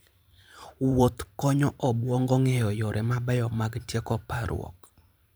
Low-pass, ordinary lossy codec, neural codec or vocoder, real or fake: none; none; vocoder, 44.1 kHz, 128 mel bands every 512 samples, BigVGAN v2; fake